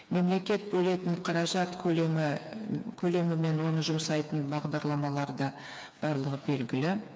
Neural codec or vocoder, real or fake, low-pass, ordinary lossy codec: codec, 16 kHz, 4 kbps, FreqCodec, smaller model; fake; none; none